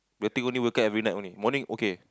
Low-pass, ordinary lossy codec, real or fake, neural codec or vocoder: none; none; real; none